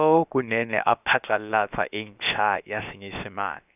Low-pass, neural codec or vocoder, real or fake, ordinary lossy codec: 3.6 kHz; codec, 16 kHz, about 1 kbps, DyCAST, with the encoder's durations; fake; none